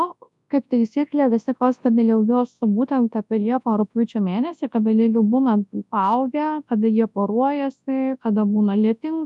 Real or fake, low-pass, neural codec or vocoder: fake; 10.8 kHz; codec, 24 kHz, 0.9 kbps, WavTokenizer, large speech release